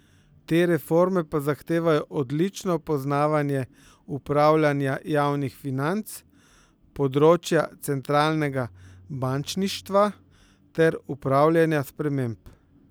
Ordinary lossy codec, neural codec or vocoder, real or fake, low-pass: none; none; real; none